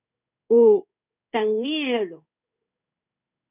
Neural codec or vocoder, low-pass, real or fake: codec, 16 kHz in and 24 kHz out, 1 kbps, XY-Tokenizer; 3.6 kHz; fake